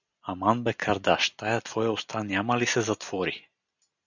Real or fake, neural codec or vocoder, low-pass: real; none; 7.2 kHz